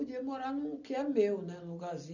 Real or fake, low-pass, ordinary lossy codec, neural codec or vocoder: real; 7.2 kHz; none; none